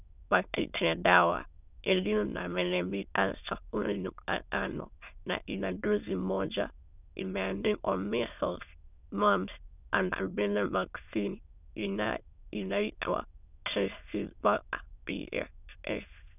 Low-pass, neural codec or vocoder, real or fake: 3.6 kHz; autoencoder, 22.05 kHz, a latent of 192 numbers a frame, VITS, trained on many speakers; fake